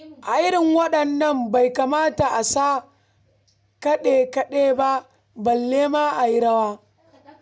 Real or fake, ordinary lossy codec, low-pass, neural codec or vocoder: real; none; none; none